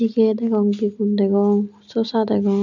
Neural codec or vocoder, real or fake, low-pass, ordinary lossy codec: none; real; 7.2 kHz; none